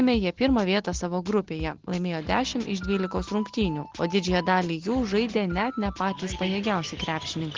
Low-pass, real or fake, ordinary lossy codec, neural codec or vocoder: 7.2 kHz; real; Opus, 16 kbps; none